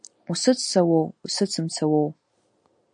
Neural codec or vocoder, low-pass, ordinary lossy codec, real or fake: none; 9.9 kHz; AAC, 64 kbps; real